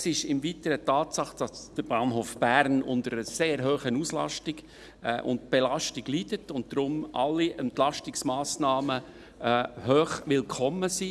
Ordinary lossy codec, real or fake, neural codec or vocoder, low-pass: none; real; none; none